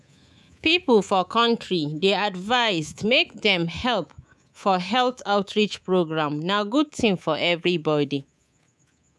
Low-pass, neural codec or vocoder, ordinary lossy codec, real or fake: none; codec, 24 kHz, 3.1 kbps, DualCodec; none; fake